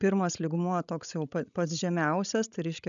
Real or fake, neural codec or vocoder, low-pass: fake; codec, 16 kHz, 16 kbps, FreqCodec, larger model; 7.2 kHz